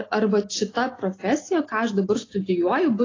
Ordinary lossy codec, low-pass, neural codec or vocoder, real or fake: AAC, 32 kbps; 7.2 kHz; none; real